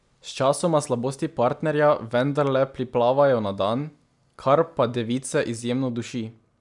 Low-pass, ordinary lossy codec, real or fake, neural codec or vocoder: 10.8 kHz; none; real; none